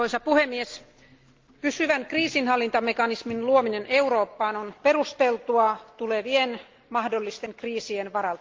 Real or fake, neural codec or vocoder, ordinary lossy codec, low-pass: real; none; Opus, 32 kbps; 7.2 kHz